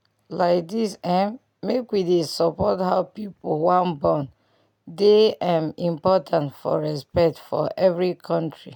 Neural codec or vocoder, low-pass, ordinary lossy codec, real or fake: none; 19.8 kHz; none; real